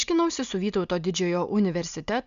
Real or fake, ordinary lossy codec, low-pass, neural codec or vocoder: real; MP3, 96 kbps; 7.2 kHz; none